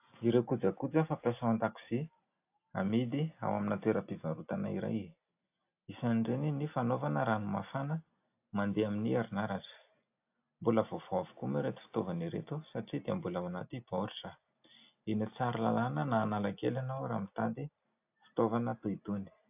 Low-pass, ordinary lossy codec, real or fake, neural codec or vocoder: 3.6 kHz; AAC, 32 kbps; real; none